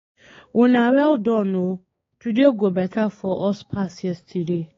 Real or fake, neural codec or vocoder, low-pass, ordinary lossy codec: fake; codec, 16 kHz, 4 kbps, X-Codec, HuBERT features, trained on balanced general audio; 7.2 kHz; AAC, 32 kbps